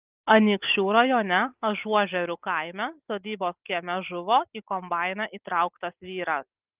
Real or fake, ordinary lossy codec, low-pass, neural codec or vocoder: real; Opus, 24 kbps; 3.6 kHz; none